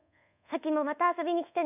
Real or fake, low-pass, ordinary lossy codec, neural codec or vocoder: fake; 3.6 kHz; none; codec, 24 kHz, 1.2 kbps, DualCodec